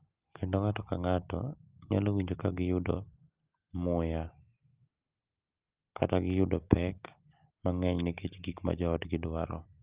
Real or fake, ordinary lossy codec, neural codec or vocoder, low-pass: real; Opus, 32 kbps; none; 3.6 kHz